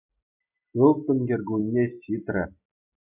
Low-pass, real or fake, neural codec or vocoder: 3.6 kHz; real; none